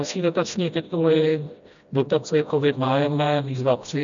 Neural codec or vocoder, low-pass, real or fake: codec, 16 kHz, 1 kbps, FreqCodec, smaller model; 7.2 kHz; fake